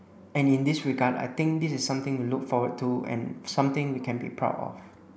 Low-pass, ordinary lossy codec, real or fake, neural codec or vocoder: none; none; real; none